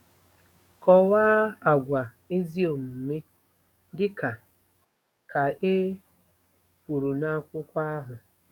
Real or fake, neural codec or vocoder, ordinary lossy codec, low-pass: fake; codec, 44.1 kHz, 7.8 kbps, DAC; none; 19.8 kHz